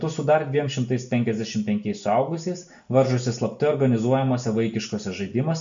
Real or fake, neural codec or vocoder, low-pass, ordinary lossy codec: real; none; 7.2 kHz; MP3, 64 kbps